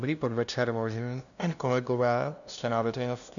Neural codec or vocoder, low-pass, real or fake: codec, 16 kHz, 0.5 kbps, FunCodec, trained on LibriTTS, 25 frames a second; 7.2 kHz; fake